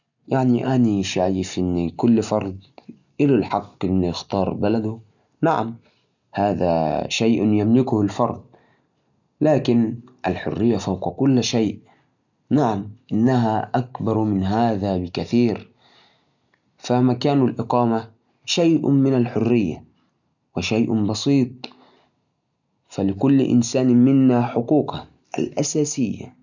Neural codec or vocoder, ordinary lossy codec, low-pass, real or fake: none; none; 7.2 kHz; real